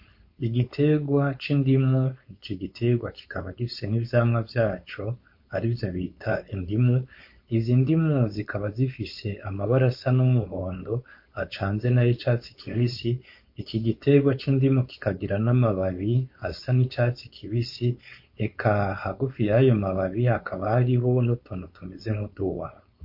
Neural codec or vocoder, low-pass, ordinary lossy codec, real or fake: codec, 16 kHz, 4.8 kbps, FACodec; 5.4 kHz; MP3, 32 kbps; fake